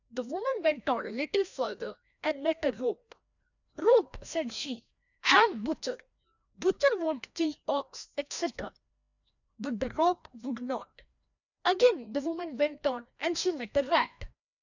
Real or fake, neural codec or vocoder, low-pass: fake; codec, 16 kHz, 1 kbps, FreqCodec, larger model; 7.2 kHz